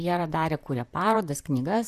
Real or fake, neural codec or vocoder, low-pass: fake; vocoder, 44.1 kHz, 128 mel bands, Pupu-Vocoder; 14.4 kHz